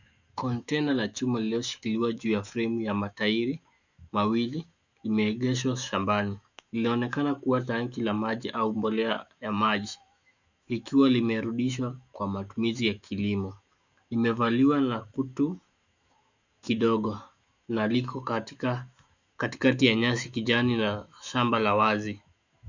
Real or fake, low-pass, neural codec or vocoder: fake; 7.2 kHz; autoencoder, 48 kHz, 128 numbers a frame, DAC-VAE, trained on Japanese speech